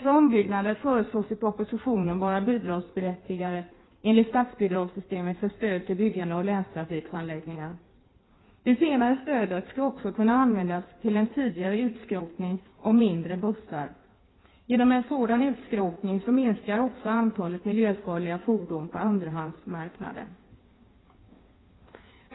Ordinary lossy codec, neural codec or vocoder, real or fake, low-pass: AAC, 16 kbps; codec, 16 kHz in and 24 kHz out, 1.1 kbps, FireRedTTS-2 codec; fake; 7.2 kHz